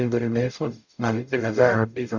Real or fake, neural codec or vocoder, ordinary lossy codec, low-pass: fake; codec, 44.1 kHz, 0.9 kbps, DAC; none; 7.2 kHz